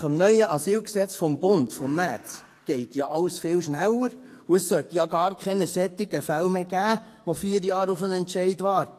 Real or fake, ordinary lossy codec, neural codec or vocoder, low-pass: fake; AAC, 64 kbps; codec, 44.1 kHz, 2.6 kbps, SNAC; 14.4 kHz